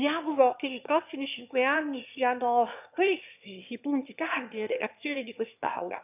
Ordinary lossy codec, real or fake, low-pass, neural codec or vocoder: none; fake; 3.6 kHz; autoencoder, 22.05 kHz, a latent of 192 numbers a frame, VITS, trained on one speaker